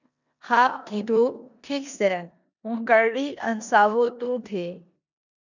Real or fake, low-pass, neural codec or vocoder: fake; 7.2 kHz; codec, 16 kHz in and 24 kHz out, 0.9 kbps, LongCat-Audio-Codec, four codebook decoder